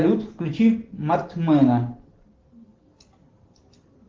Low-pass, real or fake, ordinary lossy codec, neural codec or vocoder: 7.2 kHz; real; Opus, 16 kbps; none